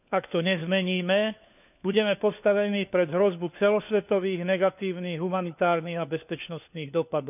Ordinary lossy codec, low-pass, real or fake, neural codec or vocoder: none; 3.6 kHz; fake; codec, 16 kHz, 4 kbps, FunCodec, trained on LibriTTS, 50 frames a second